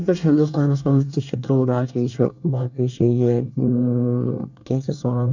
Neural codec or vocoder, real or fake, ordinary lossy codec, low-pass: codec, 24 kHz, 1 kbps, SNAC; fake; none; 7.2 kHz